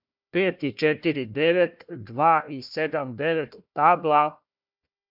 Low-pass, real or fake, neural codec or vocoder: 5.4 kHz; fake; codec, 16 kHz, 1 kbps, FunCodec, trained on Chinese and English, 50 frames a second